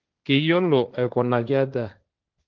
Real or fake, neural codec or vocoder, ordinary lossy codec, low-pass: fake; codec, 16 kHz, 1.1 kbps, Voila-Tokenizer; Opus, 24 kbps; 7.2 kHz